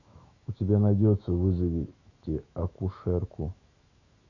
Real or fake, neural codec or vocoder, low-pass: fake; autoencoder, 48 kHz, 128 numbers a frame, DAC-VAE, trained on Japanese speech; 7.2 kHz